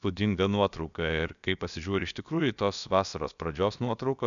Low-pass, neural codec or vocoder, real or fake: 7.2 kHz; codec, 16 kHz, about 1 kbps, DyCAST, with the encoder's durations; fake